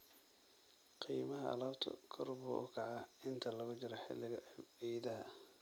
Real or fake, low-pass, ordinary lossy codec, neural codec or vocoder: real; none; none; none